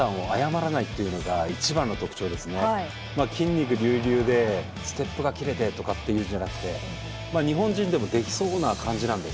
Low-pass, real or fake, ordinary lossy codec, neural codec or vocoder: none; real; none; none